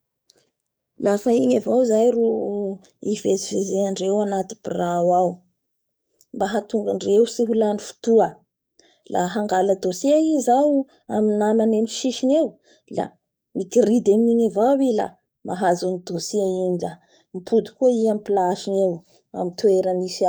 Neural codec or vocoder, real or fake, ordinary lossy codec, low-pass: codec, 44.1 kHz, 7.8 kbps, DAC; fake; none; none